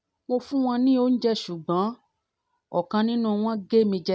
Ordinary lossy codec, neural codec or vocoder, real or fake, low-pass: none; none; real; none